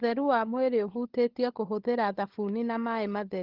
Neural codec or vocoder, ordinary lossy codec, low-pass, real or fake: codec, 16 kHz, 16 kbps, FunCodec, trained on LibriTTS, 50 frames a second; Opus, 16 kbps; 5.4 kHz; fake